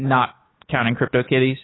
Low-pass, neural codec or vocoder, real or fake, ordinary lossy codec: 7.2 kHz; autoencoder, 48 kHz, 128 numbers a frame, DAC-VAE, trained on Japanese speech; fake; AAC, 16 kbps